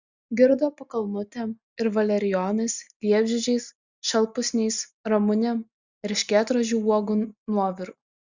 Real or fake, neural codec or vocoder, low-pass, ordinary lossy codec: real; none; 7.2 kHz; Opus, 64 kbps